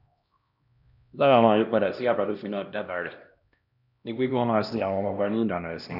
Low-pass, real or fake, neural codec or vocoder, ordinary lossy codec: 5.4 kHz; fake; codec, 16 kHz, 1 kbps, X-Codec, HuBERT features, trained on LibriSpeech; none